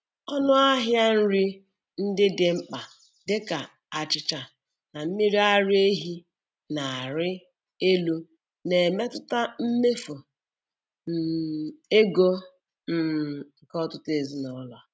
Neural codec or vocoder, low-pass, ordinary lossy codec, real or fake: none; none; none; real